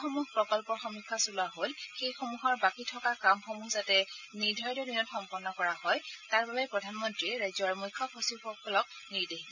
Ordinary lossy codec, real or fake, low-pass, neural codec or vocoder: none; real; 7.2 kHz; none